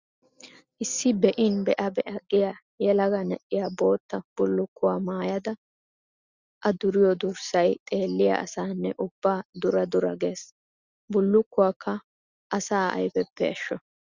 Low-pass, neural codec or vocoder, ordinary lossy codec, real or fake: 7.2 kHz; none; Opus, 64 kbps; real